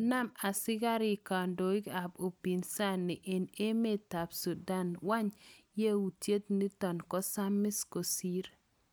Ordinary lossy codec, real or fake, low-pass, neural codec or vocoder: none; real; none; none